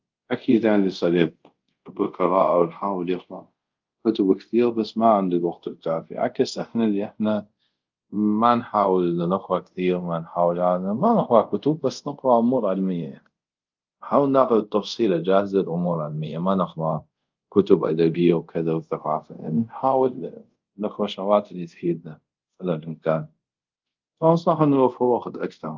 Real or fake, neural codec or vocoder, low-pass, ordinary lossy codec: fake; codec, 24 kHz, 0.5 kbps, DualCodec; 7.2 kHz; Opus, 24 kbps